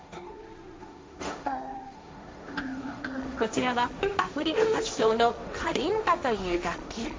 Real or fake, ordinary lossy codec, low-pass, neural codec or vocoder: fake; none; none; codec, 16 kHz, 1.1 kbps, Voila-Tokenizer